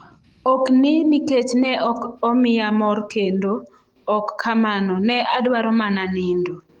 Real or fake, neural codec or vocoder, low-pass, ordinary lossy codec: fake; autoencoder, 48 kHz, 128 numbers a frame, DAC-VAE, trained on Japanese speech; 19.8 kHz; Opus, 24 kbps